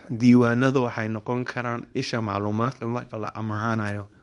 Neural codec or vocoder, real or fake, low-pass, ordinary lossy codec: codec, 24 kHz, 0.9 kbps, WavTokenizer, small release; fake; 10.8 kHz; MP3, 64 kbps